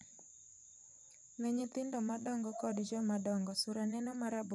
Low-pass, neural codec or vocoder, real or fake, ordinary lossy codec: none; vocoder, 24 kHz, 100 mel bands, Vocos; fake; none